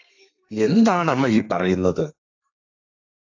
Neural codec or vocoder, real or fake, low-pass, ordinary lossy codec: codec, 32 kHz, 1.9 kbps, SNAC; fake; 7.2 kHz; AAC, 48 kbps